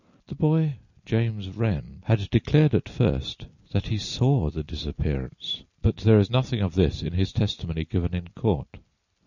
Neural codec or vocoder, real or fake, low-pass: none; real; 7.2 kHz